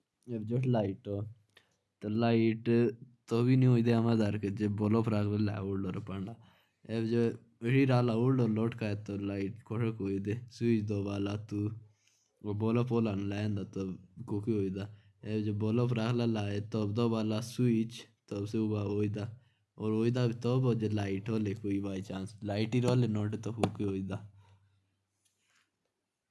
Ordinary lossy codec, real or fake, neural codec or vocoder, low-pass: none; real; none; none